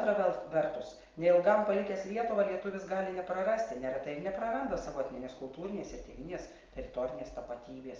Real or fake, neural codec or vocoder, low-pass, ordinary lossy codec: real; none; 7.2 kHz; Opus, 32 kbps